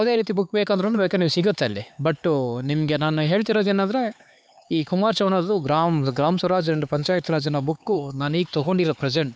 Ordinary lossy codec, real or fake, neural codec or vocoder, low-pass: none; fake; codec, 16 kHz, 4 kbps, X-Codec, HuBERT features, trained on LibriSpeech; none